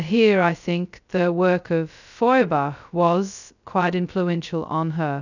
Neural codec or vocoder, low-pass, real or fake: codec, 16 kHz, 0.2 kbps, FocalCodec; 7.2 kHz; fake